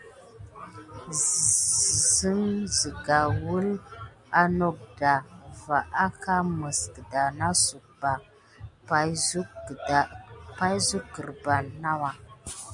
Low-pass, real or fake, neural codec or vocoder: 10.8 kHz; real; none